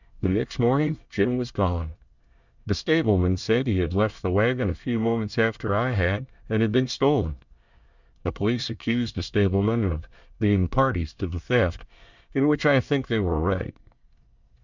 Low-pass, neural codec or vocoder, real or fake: 7.2 kHz; codec, 24 kHz, 1 kbps, SNAC; fake